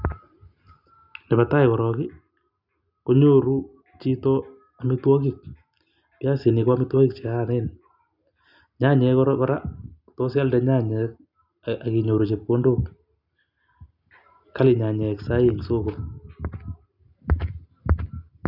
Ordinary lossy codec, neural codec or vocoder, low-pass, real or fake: none; none; 5.4 kHz; real